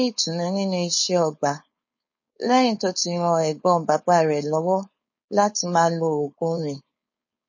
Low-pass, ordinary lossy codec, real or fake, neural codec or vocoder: 7.2 kHz; MP3, 32 kbps; fake; codec, 16 kHz, 4.8 kbps, FACodec